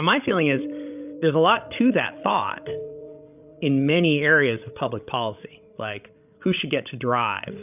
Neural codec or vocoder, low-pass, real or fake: codec, 16 kHz, 16 kbps, FreqCodec, larger model; 3.6 kHz; fake